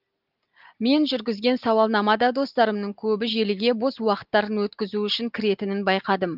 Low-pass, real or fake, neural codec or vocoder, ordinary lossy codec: 5.4 kHz; real; none; Opus, 32 kbps